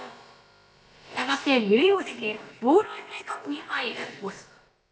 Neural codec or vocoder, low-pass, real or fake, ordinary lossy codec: codec, 16 kHz, about 1 kbps, DyCAST, with the encoder's durations; none; fake; none